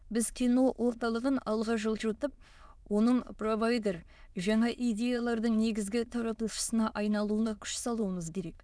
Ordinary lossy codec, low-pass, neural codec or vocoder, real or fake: none; none; autoencoder, 22.05 kHz, a latent of 192 numbers a frame, VITS, trained on many speakers; fake